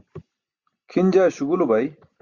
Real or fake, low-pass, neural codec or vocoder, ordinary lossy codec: real; 7.2 kHz; none; Opus, 64 kbps